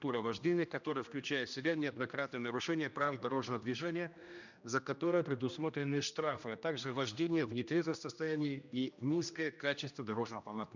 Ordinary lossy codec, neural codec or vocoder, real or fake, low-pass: none; codec, 16 kHz, 1 kbps, X-Codec, HuBERT features, trained on general audio; fake; 7.2 kHz